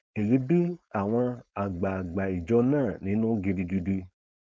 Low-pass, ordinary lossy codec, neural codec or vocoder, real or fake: none; none; codec, 16 kHz, 4.8 kbps, FACodec; fake